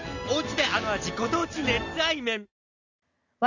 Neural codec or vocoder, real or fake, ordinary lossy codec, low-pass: none; real; none; 7.2 kHz